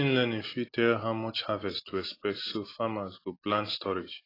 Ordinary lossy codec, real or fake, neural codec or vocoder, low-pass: AAC, 24 kbps; real; none; 5.4 kHz